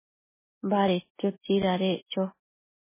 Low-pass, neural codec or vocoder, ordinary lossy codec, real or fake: 3.6 kHz; none; MP3, 16 kbps; real